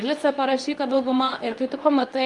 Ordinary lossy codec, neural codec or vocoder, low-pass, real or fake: Opus, 32 kbps; codec, 24 kHz, 0.9 kbps, WavTokenizer, medium speech release version 2; 10.8 kHz; fake